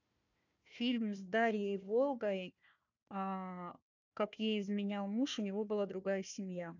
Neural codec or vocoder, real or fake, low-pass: codec, 16 kHz, 1 kbps, FunCodec, trained on Chinese and English, 50 frames a second; fake; 7.2 kHz